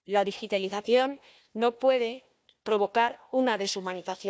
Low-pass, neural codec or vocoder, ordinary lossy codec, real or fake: none; codec, 16 kHz, 1 kbps, FunCodec, trained on Chinese and English, 50 frames a second; none; fake